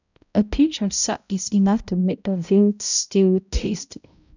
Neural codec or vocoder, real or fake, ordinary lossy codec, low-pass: codec, 16 kHz, 0.5 kbps, X-Codec, HuBERT features, trained on balanced general audio; fake; none; 7.2 kHz